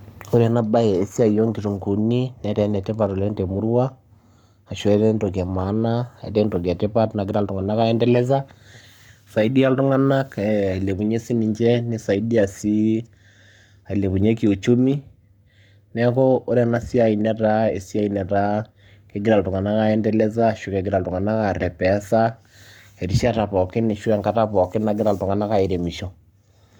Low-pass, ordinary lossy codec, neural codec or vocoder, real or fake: 19.8 kHz; none; codec, 44.1 kHz, 7.8 kbps, Pupu-Codec; fake